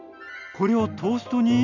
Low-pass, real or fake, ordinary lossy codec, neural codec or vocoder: 7.2 kHz; real; none; none